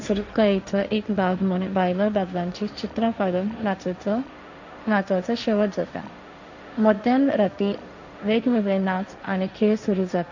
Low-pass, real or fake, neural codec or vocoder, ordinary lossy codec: 7.2 kHz; fake; codec, 16 kHz, 1.1 kbps, Voila-Tokenizer; none